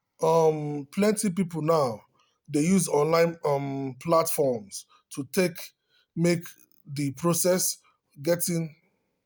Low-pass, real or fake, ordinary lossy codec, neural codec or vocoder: none; real; none; none